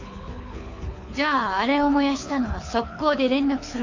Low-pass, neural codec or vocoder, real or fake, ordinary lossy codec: 7.2 kHz; codec, 24 kHz, 6 kbps, HILCodec; fake; AAC, 48 kbps